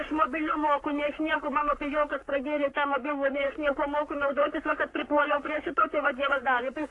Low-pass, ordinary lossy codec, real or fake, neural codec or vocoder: 10.8 kHz; AAC, 48 kbps; fake; codec, 44.1 kHz, 3.4 kbps, Pupu-Codec